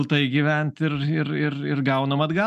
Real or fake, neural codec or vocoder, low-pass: real; none; 14.4 kHz